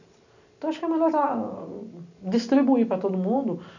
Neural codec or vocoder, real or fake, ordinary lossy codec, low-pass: none; real; none; 7.2 kHz